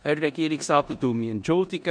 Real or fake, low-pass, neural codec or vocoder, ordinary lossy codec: fake; 9.9 kHz; codec, 16 kHz in and 24 kHz out, 0.9 kbps, LongCat-Audio-Codec, four codebook decoder; none